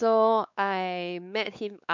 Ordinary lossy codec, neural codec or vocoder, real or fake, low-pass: none; codec, 16 kHz, 4 kbps, X-Codec, WavLM features, trained on Multilingual LibriSpeech; fake; 7.2 kHz